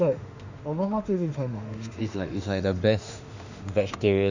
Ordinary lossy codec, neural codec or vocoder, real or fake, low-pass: Opus, 64 kbps; autoencoder, 48 kHz, 32 numbers a frame, DAC-VAE, trained on Japanese speech; fake; 7.2 kHz